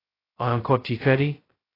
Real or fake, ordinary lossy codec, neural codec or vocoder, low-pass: fake; AAC, 24 kbps; codec, 16 kHz, 0.2 kbps, FocalCodec; 5.4 kHz